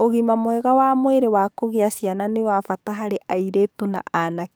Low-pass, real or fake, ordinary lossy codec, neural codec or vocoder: none; fake; none; codec, 44.1 kHz, 7.8 kbps, DAC